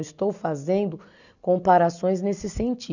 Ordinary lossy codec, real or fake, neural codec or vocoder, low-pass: none; real; none; 7.2 kHz